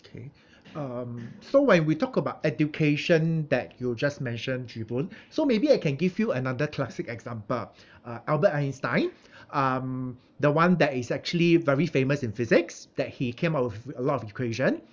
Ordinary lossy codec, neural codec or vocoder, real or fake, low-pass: none; none; real; none